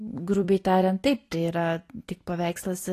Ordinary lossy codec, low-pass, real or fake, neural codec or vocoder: AAC, 48 kbps; 14.4 kHz; fake; vocoder, 44.1 kHz, 128 mel bands every 256 samples, BigVGAN v2